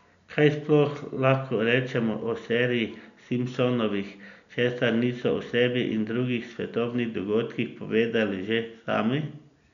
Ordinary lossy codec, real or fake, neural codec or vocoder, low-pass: none; real; none; 7.2 kHz